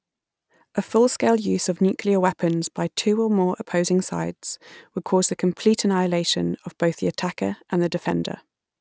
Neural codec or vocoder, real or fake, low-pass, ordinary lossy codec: none; real; none; none